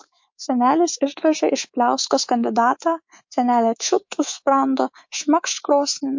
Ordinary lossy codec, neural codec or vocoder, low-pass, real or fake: MP3, 48 kbps; codec, 24 kHz, 3.1 kbps, DualCodec; 7.2 kHz; fake